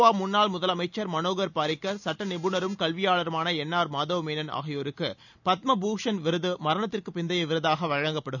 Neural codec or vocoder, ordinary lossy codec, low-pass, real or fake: none; none; 7.2 kHz; real